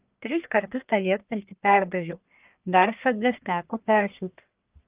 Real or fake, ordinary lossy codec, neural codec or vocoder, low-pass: fake; Opus, 24 kbps; codec, 44.1 kHz, 1.7 kbps, Pupu-Codec; 3.6 kHz